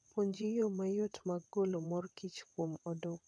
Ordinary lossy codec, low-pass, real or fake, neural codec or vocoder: none; none; fake; vocoder, 22.05 kHz, 80 mel bands, WaveNeXt